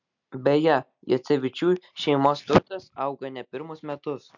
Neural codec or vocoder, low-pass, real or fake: none; 7.2 kHz; real